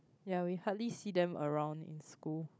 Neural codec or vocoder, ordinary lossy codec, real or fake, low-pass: codec, 16 kHz, 16 kbps, FunCodec, trained on Chinese and English, 50 frames a second; none; fake; none